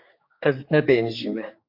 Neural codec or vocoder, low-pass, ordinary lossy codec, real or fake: codec, 16 kHz, 4 kbps, X-Codec, HuBERT features, trained on general audio; 5.4 kHz; MP3, 32 kbps; fake